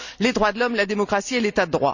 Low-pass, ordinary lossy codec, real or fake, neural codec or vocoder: 7.2 kHz; none; real; none